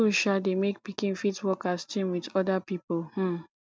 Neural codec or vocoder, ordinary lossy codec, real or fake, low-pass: none; none; real; none